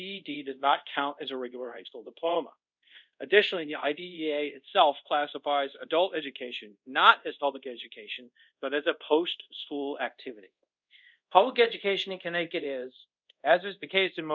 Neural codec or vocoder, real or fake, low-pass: codec, 24 kHz, 0.5 kbps, DualCodec; fake; 7.2 kHz